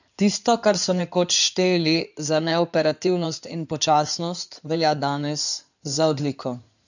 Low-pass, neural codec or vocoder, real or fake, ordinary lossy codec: 7.2 kHz; codec, 16 kHz in and 24 kHz out, 2.2 kbps, FireRedTTS-2 codec; fake; none